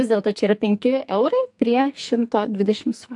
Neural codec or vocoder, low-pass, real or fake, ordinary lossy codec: codec, 44.1 kHz, 2.6 kbps, SNAC; 10.8 kHz; fake; AAC, 64 kbps